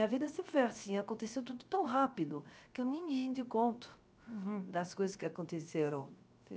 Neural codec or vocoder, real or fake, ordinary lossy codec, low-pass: codec, 16 kHz, 0.7 kbps, FocalCodec; fake; none; none